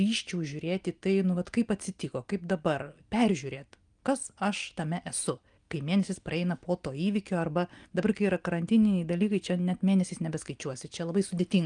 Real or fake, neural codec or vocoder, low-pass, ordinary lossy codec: real; none; 9.9 kHz; Opus, 32 kbps